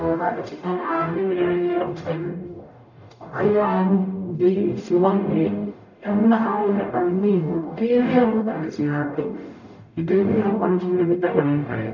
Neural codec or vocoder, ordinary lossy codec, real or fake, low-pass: codec, 44.1 kHz, 0.9 kbps, DAC; none; fake; 7.2 kHz